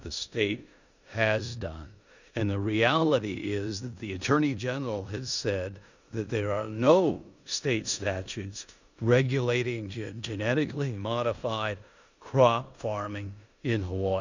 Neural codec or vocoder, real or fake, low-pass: codec, 16 kHz in and 24 kHz out, 0.9 kbps, LongCat-Audio-Codec, four codebook decoder; fake; 7.2 kHz